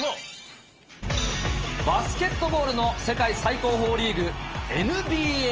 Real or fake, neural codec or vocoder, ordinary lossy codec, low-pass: real; none; Opus, 24 kbps; 7.2 kHz